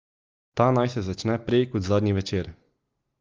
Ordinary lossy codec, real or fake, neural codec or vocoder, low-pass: Opus, 32 kbps; real; none; 7.2 kHz